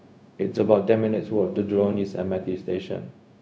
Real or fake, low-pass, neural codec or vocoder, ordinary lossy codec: fake; none; codec, 16 kHz, 0.4 kbps, LongCat-Audio-Codec; none